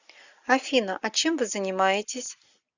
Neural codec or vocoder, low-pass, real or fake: none; 7.2 kHz; real